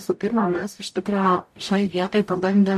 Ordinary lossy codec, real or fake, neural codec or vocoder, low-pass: MP3, 64 kbps; fake; codec, 44.1 kHz, 0.9 kbps, DAC; 14.4 kHz